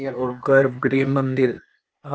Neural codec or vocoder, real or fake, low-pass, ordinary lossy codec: codec, 16 kHz, 0.8 kbps, ZipCodec; fake; none; none